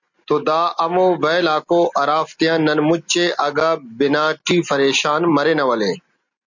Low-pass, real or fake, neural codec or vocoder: 7.2 kHz; real; none